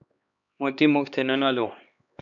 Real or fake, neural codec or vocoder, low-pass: fake; codec, 16 kHz, 2 kbps, X-Codec, HuBERT features, trained on LibriSpeech; 7.2 kHz